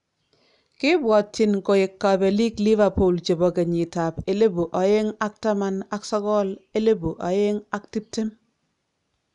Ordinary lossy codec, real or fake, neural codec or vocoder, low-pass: none; real; none; 10.8 kHz